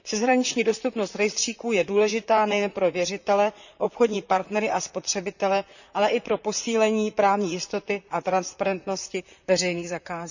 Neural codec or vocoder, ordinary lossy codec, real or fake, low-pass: vocoder, 44.1 kHz, 128 mel bands, Pupu-Vocoder; none; fake; 7.2 kHz